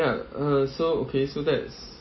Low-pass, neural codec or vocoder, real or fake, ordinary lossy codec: 7.2 kHz; none; real; MP3, 24 kbps